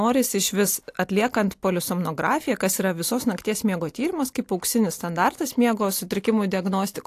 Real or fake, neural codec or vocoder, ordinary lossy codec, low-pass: real; none; AAC, 64 kbps; 14.4 kHz